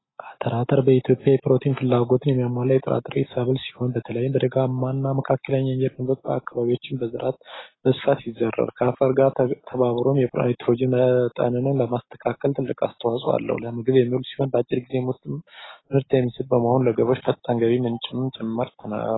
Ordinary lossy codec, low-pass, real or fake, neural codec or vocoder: AAC, 16 kbps; 7.2 kHz; real; none